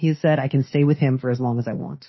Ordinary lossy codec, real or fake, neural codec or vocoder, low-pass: MP3, 24 kbps; fake; autoencoder, 48 kHz, 32 numbers a frame, DAC-VAE, trained on Japanese speech; 7.2 kHz